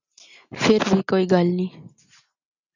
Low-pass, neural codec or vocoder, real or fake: 7.2 kHz; none; real